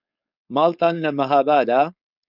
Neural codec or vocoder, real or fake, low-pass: codec, 16 kHz, 4.8 kbps, FACodec; fake; 5.4 kHz